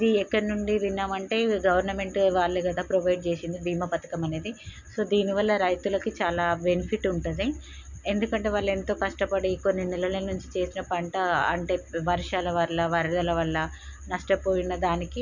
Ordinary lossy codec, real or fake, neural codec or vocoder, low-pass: none; real; none; 7.2 kHz